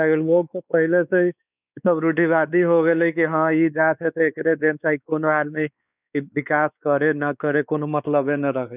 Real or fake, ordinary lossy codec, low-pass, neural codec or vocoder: fake; none; 3.6 kHz; codec, 16 kHz, 4 kbps, X-Codec, WavLM features, trained on Multilingual LibriSpeech